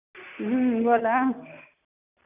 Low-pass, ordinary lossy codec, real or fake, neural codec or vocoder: 3.6 kHz; none; real; none